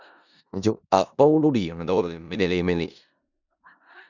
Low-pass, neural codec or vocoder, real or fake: 7.2 kHz; codec, 16 kHz in and 24 kHz out, 0.4 kbps, LongCat-Audio-Codec, four codebook decoder; fake